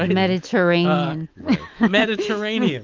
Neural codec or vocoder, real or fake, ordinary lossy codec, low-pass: autoencoder, 48 kHz, 128 numbers a frame, DAC-VAE, trained on Japanese speech; fake; Opus, 24 kbps; 7.2 kHz